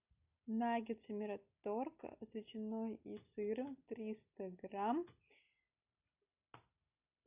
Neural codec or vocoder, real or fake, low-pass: none; real; 3.6 kHz